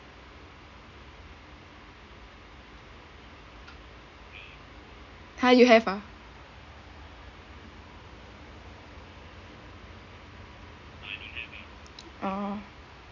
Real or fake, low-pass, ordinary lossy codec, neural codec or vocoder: real; 7.2 kHz; none; none